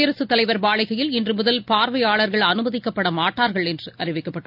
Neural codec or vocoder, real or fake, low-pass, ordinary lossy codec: none; real; 5.4 kHz; none